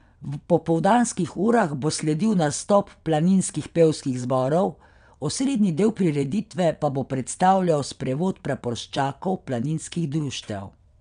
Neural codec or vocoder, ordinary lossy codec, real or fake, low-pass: vocoder, 22.05 kHz, 80 mel bands, WaveNeXt; none; fake; 9.9 kHz